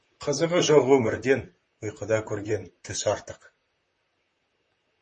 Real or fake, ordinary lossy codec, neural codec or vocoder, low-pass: fake; MP3, 32 kbps; vocoder, 44.1 kHz, 128 mel bands, Pupu-Vocoder; 10.8 kHz